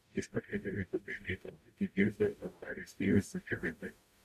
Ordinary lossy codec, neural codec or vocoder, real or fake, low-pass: MP3, 96 kbps; codec, 44.1 kHz, 0.9 kbps, DAC; fake; 14.4 kHz